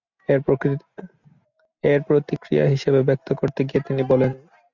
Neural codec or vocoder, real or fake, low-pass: none; real; 7.2 kHz